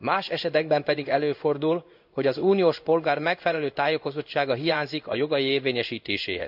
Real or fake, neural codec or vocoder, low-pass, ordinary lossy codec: fake; codec, 16 kHz in and 24 kHz out, 1 kbps, XY-Tokenizer; 5.4 kHz; none